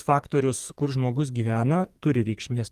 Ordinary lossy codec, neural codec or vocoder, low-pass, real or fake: Opus, 24 kbps; codec, 44.1 kHz, 2.6 kbps, SNAC; 14.4 kHz; fake